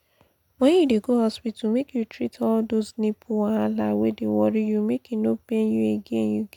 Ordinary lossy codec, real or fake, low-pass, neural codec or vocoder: none; real; 19.8 kHz; none